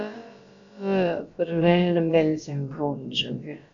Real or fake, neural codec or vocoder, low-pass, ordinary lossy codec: fake; codec, 16 kHz, about 1 kbps, DyCAST, with the encoder's durations; 7.2 kHz; AAC, 64 kbps